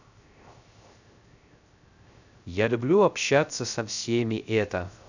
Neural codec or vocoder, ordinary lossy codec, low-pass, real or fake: codec, 16 kHz, 0.3 kbps, FocalCodec; none; 7.2 kHz; fake